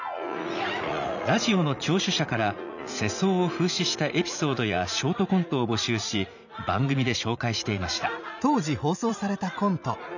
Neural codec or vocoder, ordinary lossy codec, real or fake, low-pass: vocoder, 44.1 kHz, 80 mel bands, Vocos; none; fake; 7.2 kHz